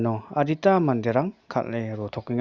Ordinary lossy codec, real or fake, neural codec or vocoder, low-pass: Opus, 64 kbps; real; none; 7.2 kHz